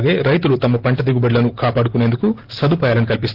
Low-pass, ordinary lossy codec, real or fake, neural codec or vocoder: 5.4 kHz; Opus, 16 kbps; real; none